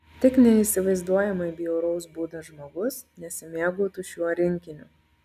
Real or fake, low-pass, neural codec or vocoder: real; 14.4 kHz; none